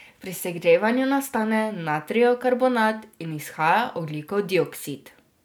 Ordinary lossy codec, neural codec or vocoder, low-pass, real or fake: none; none; none; real